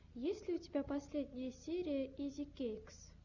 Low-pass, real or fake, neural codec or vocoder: 7.2 kHz; real; none